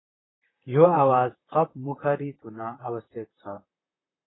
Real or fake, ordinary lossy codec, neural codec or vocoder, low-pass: fake; AAC, 16 kbps; vocoder, 44.1 kHz, 128 mel bands, Pupu-Vocoder; 7.2 kHz